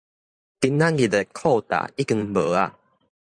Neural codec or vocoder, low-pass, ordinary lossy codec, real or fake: vocoder, 44.1 kHz, 128 mel bands every 512 samples, BigVGAN v2; 9.9 kHz; MP3, 96 kbps; fake